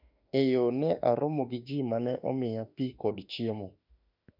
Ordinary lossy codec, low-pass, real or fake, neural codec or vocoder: none; 5.4 kHz; fake; autoencoder, 48 kHz, 32 numbers a frame, DAC-VAE, trained on Japanese speech